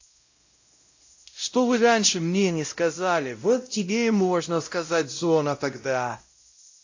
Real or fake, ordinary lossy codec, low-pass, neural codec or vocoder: fake; AAC, 48 kbps; 7.2 kHz; codec, 16 kHz, 0.5 kbps, X-Codec, WavLM features, trained on Multilingual LibriSpeech